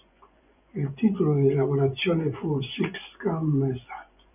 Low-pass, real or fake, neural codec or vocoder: 3.6 kHz; fake; vocoder, 24 kHz, 100 mel bands, Vocos